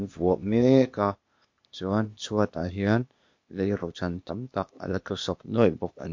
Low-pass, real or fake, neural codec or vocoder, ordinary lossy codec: 7.2 kHz; fake; codec, 16 kHz, 0.8 kbps, ZipCodec; MP3, 48 kbps